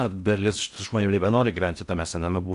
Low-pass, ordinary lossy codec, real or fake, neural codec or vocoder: 10.8 kHz; AAC, 96 kbps; fake; codec, 16 kHz in and 24 kHz out, 0.6 kbps, FocalCodec, streaming, 4096 codes